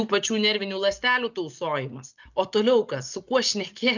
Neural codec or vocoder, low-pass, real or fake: none; 7.2 kHz; real